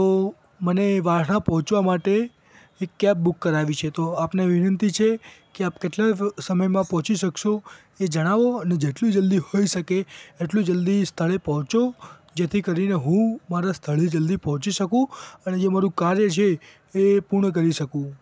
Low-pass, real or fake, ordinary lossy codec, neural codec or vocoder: none; real; none; none